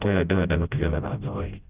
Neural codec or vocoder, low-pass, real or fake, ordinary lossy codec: codec, 16 kHz, 0.5 kbps, FreqCodec, smaller model; 3.6 kHz; fake; Opus, 32 kbps